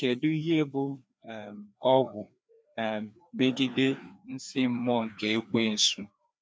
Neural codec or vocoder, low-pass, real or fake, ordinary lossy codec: codec, 16 kHz, 2 kbps, FreqCodec, larger model; none; fake; none